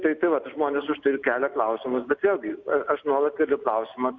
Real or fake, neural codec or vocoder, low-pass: real; none; 7.2 kHz